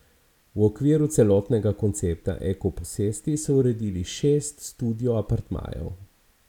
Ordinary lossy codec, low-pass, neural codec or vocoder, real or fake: none; 19.8 kHz; none; real